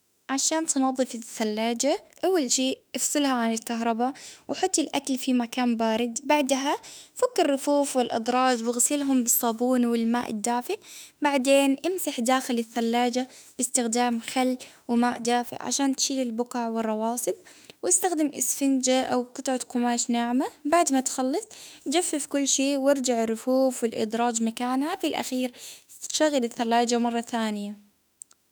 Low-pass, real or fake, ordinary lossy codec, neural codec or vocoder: none; fake; none; autoencoder, 48 kHz, 32 numbers a frame, DAC-VAE, trained on Japanese speech